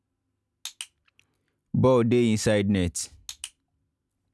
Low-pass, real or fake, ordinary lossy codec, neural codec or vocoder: none; real; none; none